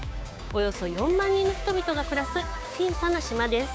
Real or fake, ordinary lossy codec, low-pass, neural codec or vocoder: fake; none; none; codec, 16 kHz, 6 kbps, DAC